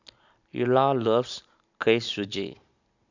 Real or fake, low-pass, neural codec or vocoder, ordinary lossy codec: real; 7.2 kHz; none; none